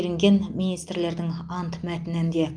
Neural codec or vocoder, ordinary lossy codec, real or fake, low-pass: none; Opus, 32 kbps; real; 9.9 kHz